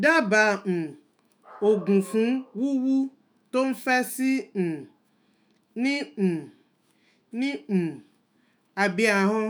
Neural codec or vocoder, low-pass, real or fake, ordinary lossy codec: autoencoder, 48 kHz, 128 numbers a frame, DAC-VAE, trained on Japanese speech; none; fake; none